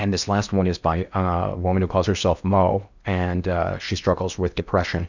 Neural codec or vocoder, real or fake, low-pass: codec, 16 kHz in and 24 kHz out, 0.8 kbps, FocalCodec, streaming, 65536 codes; fake; 7.2 kHz